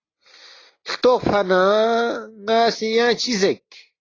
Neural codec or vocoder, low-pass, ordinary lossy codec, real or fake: none; 7.2 kHz; AAC, 32 kbps; real